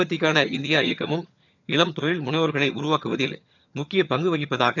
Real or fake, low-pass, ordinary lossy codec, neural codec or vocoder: fake; 7.2 kHz; none; vocoder, 22.05 kHz, 80 mel bands, HiFi-GAN